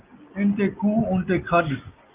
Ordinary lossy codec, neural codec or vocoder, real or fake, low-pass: Opus, 24 kbps; none; real; 3.6 kHz